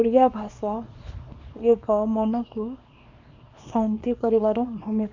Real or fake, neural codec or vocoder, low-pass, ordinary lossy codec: fake; codec, 24 kHz, 0.9 kbps, WavTokenizer, small release; 7.2 kHz; MP3, 64 kbps